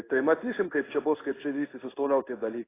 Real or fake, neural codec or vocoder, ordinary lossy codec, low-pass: fake; codec, 16 kHz in and 24 kHz out, 1 kbps, XY-Tokenizer; AAC, 16 kbps; 3.6 kHz